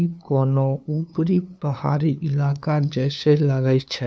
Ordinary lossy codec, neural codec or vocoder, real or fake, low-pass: none; codec, 16 kHz, 2 kbps, FunCodec, trained on LibriTTS, 25 frames a second; fake; none